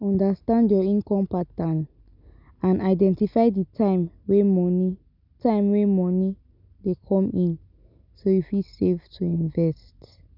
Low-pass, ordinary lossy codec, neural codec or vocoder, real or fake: 5.4 kHz; none; none; real